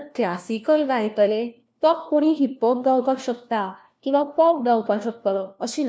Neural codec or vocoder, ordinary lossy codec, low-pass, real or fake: codec, 16 kHz, 1 kbps, FunCodec, trained on LibriTTS, 50 frames a second; none; none; fake